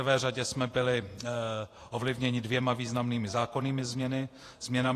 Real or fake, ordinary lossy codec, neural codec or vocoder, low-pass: fake; AAC, 48 kbps; vocoder, 48 kHz, 128 mel bands, Vocos; 14.4 kHz